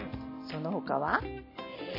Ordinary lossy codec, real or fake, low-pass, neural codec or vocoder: MP3, 24 kbps; real; 5.4 kHz; none